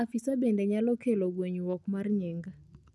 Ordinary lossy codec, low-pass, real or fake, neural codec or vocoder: none; none; real; none